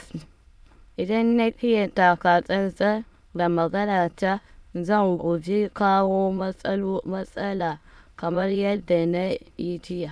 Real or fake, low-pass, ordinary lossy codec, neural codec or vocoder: fake; none; none; autoencoder, 22.05 kHz, a latent of 192 numbers a frame, VITS, trained on many speakers